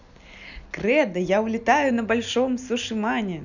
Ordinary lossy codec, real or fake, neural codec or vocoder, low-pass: none; real; none; 7.2 kHz